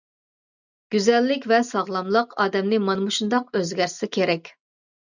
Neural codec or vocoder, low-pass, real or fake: none; 7.2 kHz; real